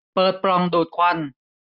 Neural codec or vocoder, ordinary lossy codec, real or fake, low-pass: none; none; real; 5.4 kHz